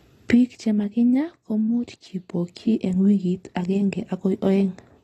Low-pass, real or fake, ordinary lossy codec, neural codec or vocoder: 19.8 kHz; real; AAC, 32 kbps; none